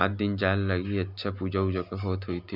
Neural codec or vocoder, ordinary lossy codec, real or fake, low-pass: none; none; real; 5.4 kHz